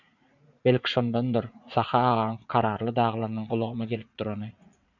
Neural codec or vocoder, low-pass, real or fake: vocoder, 44.1 kHz, 80 mel bands, Vocos; 7.2 kHz; fake